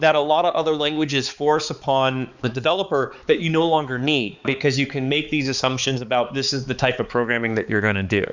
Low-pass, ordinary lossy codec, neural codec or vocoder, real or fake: 7.2 kHz; Opus, 64 kbps; codec, 16 kHz, 4 kbps, X-Codec, HuBERT features, trained on balanced general audio; fake